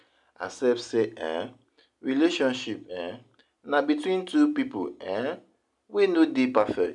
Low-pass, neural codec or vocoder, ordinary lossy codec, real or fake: 10.8 kHz; none; none; real